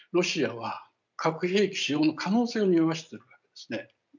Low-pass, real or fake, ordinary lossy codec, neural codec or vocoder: 7.2 kHz; real; none; none